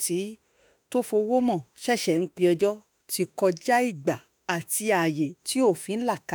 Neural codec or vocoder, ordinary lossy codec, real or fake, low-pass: autoencoder, 48 kHz, 32 numbers a frame, DAC-VAE, trained on Japanese speech; none; fake; none